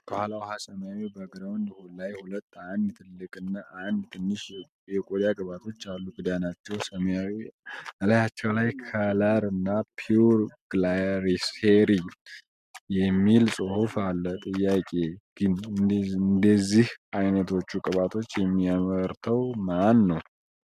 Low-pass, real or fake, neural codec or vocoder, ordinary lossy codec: 14.4 kHz; real; none; MP3, 96 kbps